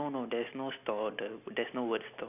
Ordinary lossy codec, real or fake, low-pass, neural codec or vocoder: none; real; 3.6 kHz; none